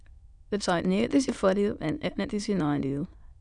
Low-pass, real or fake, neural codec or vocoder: 9.9 kHz; fake; autoencoder, 22.05 kHz, a latent of 192 numbers a frame, VITS, trained on many speakers